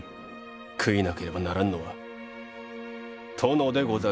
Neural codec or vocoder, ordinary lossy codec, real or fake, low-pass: none; none; real; none